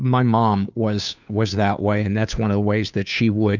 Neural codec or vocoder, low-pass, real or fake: codec, 16 kHz, 2 kbps, FunCodec, trained on Chinese and English, 25 frames a second; 7.2 kHz; fake